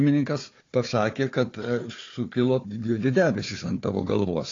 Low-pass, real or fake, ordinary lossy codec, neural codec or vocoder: 7.2 kHz; fake; AAC, 32 kbps; codec, 16 kHz, 4 kbps, FunCodec, trained on Chinese and English, 50 frames a second